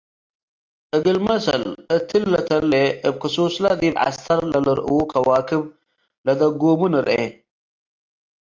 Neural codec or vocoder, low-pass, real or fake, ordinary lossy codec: none; 7.2 kHz; real; Opus, 32 kbps